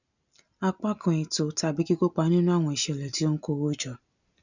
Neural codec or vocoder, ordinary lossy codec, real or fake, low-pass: none; none; real; 7.2 kHz